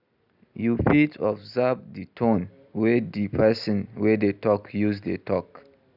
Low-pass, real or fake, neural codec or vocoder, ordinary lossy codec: 5.4 kHz; real; none; none